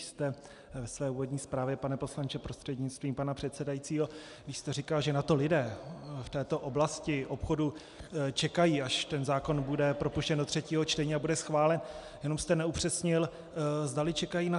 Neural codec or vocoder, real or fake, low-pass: none; real; 10.8 kHz